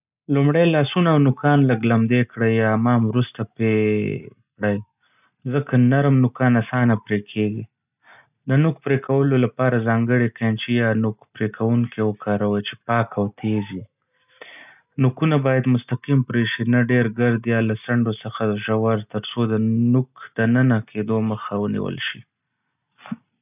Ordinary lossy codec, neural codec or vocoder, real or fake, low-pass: none; none; real; 3.6 kHz